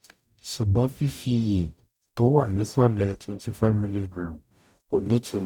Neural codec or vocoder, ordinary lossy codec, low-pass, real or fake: codec, 44.1 kHz, 0.9 kbps, DAC; none; 19.8 kHz; fake